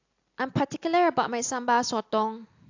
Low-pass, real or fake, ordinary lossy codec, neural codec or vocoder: 7.2 kHz; real; AAC, 48 kbps; none